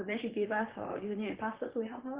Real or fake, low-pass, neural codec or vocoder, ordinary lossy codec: fake; 3.6 kHz; vocoder, 44.1 kHz, 128 mel bands, Pupu-Vocoder; Opus, 16 kbps